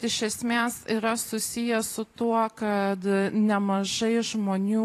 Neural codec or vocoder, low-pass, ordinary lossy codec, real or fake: vocoder, 44.1 kHz, 128 mel bands every 512 samples, BigVGAN v2; 14.4 kHz; AAC, 48 kbps; fake